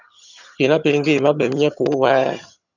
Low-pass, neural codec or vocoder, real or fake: 7.2 kHz; vocoder, 22.05 kHz, 80 mel bands, HiFi-GAN; fake